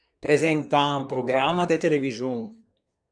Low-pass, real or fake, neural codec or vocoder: 9.9 kHz; fake; codec, 24 kHz, 1 kbps, SNAC